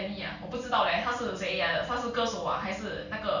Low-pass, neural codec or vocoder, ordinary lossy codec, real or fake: 7.2 kHz; none; none; real